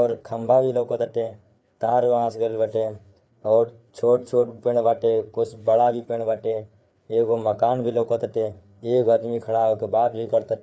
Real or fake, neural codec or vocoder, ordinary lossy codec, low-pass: fake; codec, 16 kHz, 4 kbps, FreqCodec, larger model; none; none